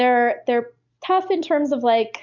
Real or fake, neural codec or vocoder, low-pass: real; none; 7.2 kHz